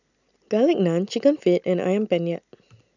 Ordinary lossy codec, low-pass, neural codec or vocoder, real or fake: none; 7.2 kHz; none; real